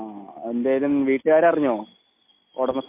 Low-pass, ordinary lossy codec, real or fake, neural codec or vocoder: 3.6 kHz; MP3, 24 kbps; real; none